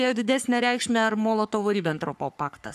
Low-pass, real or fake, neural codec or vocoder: 14.4 kHz; fake; codec, 44.1 kHz, 3.4 kbps, Pupu-Codec